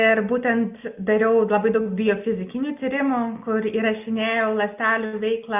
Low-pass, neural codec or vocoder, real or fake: 3.6 kHz; none; real